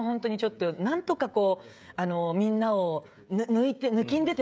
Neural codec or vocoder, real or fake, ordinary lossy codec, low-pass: codec, 16 kHz, 16 kbps, FreqCodec, smaller model; fake; none; none